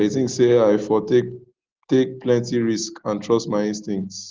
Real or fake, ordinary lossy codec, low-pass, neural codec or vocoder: real; Opus, 16 kbps; 7.2 kHz; none